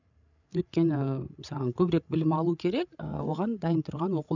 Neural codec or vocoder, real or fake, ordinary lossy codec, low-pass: codec, 16 kHz, 16 kbps, FreqCodec, larger model; fake; none; 7.2 kHz